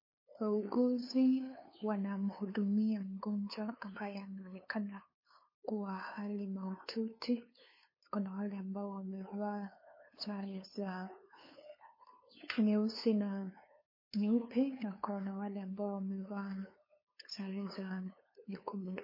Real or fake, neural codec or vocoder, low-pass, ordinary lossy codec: fake; codec, 16 kHz, 2 kbps, FunCodec, trained on LibriTTS, 25 frames a second; 5.4 kHz; MP3, 24 kbps